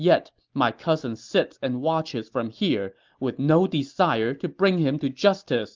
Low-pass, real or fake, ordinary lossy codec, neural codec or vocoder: 7.2 kHz; real; Opus, 32 kbps; none